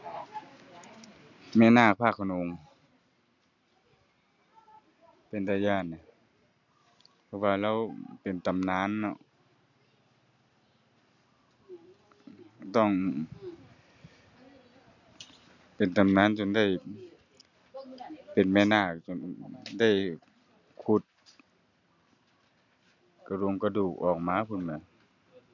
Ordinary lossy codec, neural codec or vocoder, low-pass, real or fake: none; none; 7.2 kHz; real